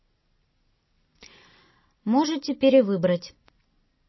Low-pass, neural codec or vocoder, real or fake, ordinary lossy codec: 7.2 kHz; none; real; MP3, 24 kbps